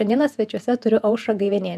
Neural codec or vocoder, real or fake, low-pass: vocoder, 48 kHz, 128 mel bands, Vocos; fake; 14.4 kHz